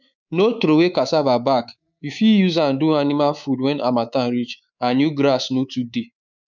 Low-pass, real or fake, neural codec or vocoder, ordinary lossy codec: 7.2 kHz; fake; codec, 24 kHz, 3.1 kbps, DualCodec; none